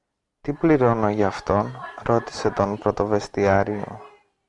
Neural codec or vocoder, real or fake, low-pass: none; real; 10.8 kHz